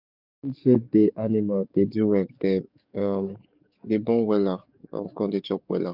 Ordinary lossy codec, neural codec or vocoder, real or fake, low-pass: none; codec, 44.1 kHz, 7.8 kbps, DAC; fake; 5.4 kHz